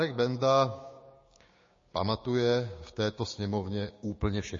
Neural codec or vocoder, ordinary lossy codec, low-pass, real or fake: codec, 16 kHz, 6 kbps, DAC; MP3, 32 kbps; 7.2 kHz; fake